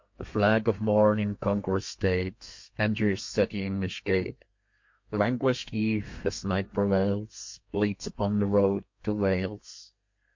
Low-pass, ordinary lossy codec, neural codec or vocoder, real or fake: 7.2 kHz; MP3, 48 kbps; codec, 32 kHz, 1.9 kbps, SNAC; fake